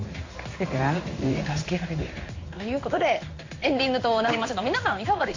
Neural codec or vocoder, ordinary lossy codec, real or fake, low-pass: codec, 16 kHz in and 24 kHz out, 1 kbps, XY-Tokenizer; AAC, 48 kbps; fake; 7.2 kHz